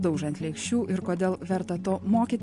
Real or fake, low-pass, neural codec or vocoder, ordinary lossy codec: real; 14.4 kHz; none; MP3, 48 kbps